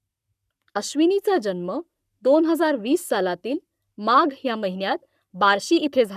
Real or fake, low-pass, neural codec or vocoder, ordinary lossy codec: fake; 14.4 kHz; codec, 44.1 kHz, 7.8 kbps, Pupu-Codec; none